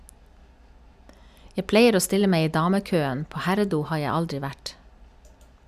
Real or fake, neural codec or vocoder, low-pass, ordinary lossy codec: real; none; 14.4 kHz; none